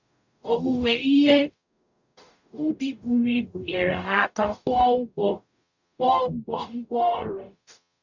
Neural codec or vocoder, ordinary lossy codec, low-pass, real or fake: codec, 44.1 kHz, 0.9 kbps, DAC; none; 7.2 kHz; fake